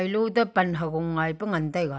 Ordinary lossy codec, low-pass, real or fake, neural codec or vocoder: none; none; real; none